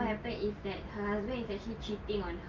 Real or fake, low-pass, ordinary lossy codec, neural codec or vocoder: real; 7.2 kHz; Opus, 24 kbps; none